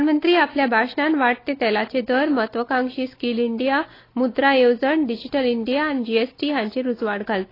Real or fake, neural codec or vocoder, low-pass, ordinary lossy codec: real; none; 5.4 kHz; AAC, 24 kbps